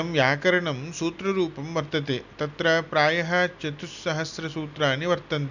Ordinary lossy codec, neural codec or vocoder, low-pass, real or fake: none; none; 7.2 kHz; real